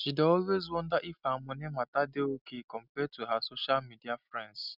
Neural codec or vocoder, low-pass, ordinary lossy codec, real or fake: none; 5.4 kHz; none; real